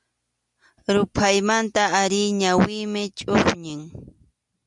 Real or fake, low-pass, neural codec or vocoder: real; 10.8 kHz; none